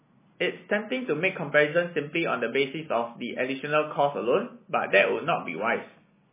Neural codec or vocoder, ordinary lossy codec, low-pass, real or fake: none; MP3, 16 kbps; 3.6 kHz; real